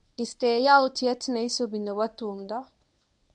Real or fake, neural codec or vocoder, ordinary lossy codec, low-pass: fake; codec, 24 kHz, 0.9 kbps, WavTokenizer, medium speech release version 1; none; 10.8 kHz